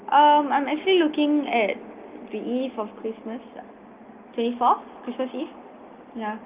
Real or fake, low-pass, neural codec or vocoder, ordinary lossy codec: real; 3.6 kHz; none; Opus, 24 kbps